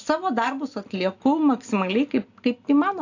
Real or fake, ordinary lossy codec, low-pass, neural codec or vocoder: real; AAC, 48 kbps; 7.2 kHz; none